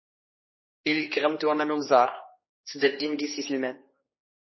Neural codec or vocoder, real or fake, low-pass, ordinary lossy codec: codec, 16 kHz, 2 kbps, X-Codec, HuBERT features, trained on general audio; fake; 7.2 kHz; MP3, 24 kbps